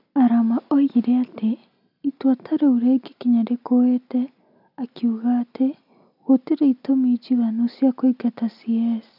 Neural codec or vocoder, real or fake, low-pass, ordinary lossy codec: none; real; 5.4 kHz; none